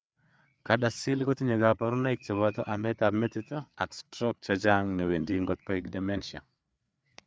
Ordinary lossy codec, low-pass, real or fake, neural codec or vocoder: none; none; fake; codec, 16 kHz, 4 kbps, FreqCodec, larger model